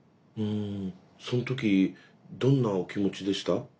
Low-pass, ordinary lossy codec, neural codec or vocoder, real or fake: none; none; none; real